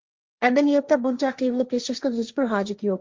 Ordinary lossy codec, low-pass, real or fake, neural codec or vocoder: Opus, 32 kbps; 7.2 kHz; fake; codec, 16 kHz, 1.1 kbps, Voila-Tokenizer